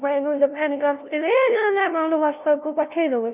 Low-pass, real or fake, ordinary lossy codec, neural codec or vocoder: 3.6 kHz; fake; none; codec, 16 kHz, 0.5 kbps, FunCodec, trained on LibriTTS, 25 frames a second